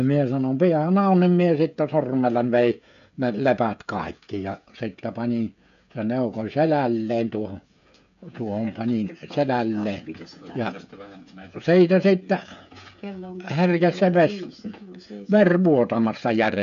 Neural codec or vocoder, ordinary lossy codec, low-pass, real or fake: codec, 16 kHz, 16 kbps, FreqCodec, smaller model; none; 7.2 kHz; fake